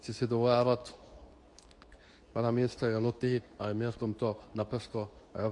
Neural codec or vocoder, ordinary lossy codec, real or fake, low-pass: codec, 24 kHz, 0.9 kbps, WavTokenizer, medium speech release version 2; AAC, 48 kbps; fake; 10.8 kHz